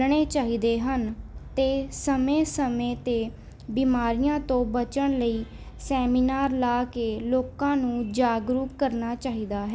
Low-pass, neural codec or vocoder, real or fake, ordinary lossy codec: none; none; real; none